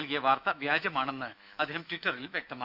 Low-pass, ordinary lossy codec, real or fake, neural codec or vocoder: 5.4 kHz; Opus, 64 kbps; fake; autoencoder, 48 kHz, 128 numbers a frame, DAC-VAE, trained on Japanese speech